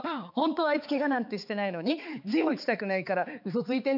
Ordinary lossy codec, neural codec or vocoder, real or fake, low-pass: none; codec, 16 kHz, 4 kbps, X-Codec, HuBERT features, trained on balanced general audio; fake; 5.4 kHz